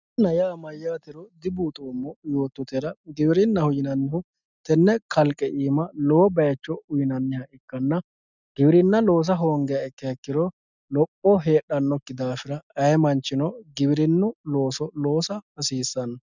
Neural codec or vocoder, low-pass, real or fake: none; 7.2 kHz; real